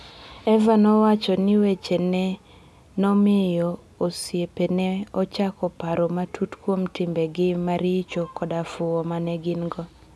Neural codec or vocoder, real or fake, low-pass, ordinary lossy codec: none; real; none; none